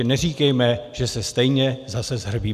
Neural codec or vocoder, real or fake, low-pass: none; real; 14.4 kHz